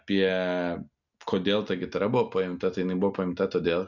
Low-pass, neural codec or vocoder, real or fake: 7.2 kHz; none; real